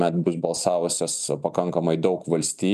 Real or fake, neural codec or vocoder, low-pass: real; none; 14.4 kHz